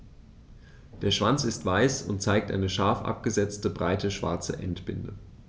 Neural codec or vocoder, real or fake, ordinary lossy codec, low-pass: none; real; none; none